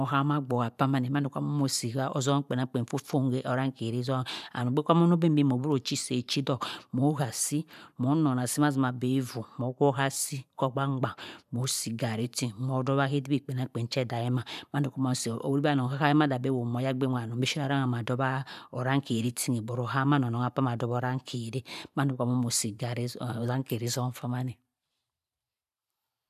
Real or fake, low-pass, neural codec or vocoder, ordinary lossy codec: fake; 14.4 kHz; vocoder, 44.1 kHz, 128 mel bands every 512 samples, BigVGAN v2; none